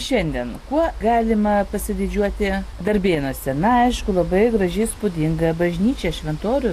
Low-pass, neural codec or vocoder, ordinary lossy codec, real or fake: 14.4 kHz; none; AAC, 64 kbps; real